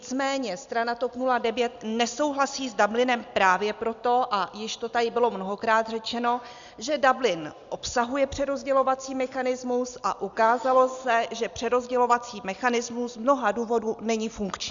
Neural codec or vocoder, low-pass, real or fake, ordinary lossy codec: none; 7.2 kHz; real; Opus, 64 kbps